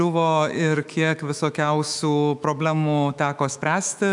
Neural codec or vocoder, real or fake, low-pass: autoencoder, 48 kHz, 128 numbers a frame, DAC-VAE, trained on Japanese speech; fake; 10.8 kHz